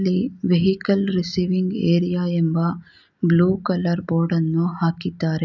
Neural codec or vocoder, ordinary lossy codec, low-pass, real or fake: none; none; 7.2 kHz; real